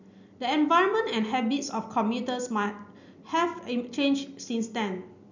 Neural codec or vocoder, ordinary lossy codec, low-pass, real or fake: none; none; 7.2 kHz; real